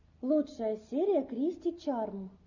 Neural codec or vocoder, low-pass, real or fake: none; 7.2 kHz; real